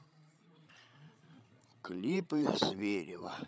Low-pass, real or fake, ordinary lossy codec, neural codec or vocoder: none; fake; none; codec, 16 kHz, 16 kbps, FreqCodec, larger model